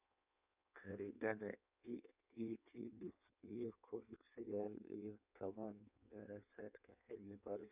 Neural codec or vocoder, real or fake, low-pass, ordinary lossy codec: codec, 16 kHz in and 24 kHz out, 1.1 kbps, FireRedTTS-2 codec; fake; 3.6 kHz; none